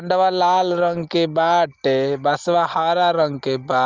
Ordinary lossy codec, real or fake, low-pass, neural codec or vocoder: Opus, 32 kbps; real; 7.2 kHz; none